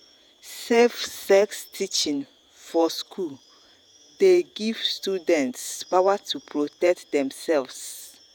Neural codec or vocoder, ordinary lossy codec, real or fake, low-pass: vocoder, 48 kHz, 128 mel bands, Vocos; none; fake; 19.8 kHz